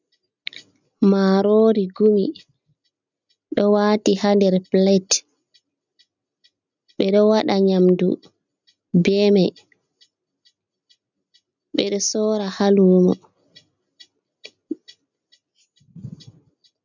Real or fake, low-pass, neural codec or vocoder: real; 7.2 kHz; none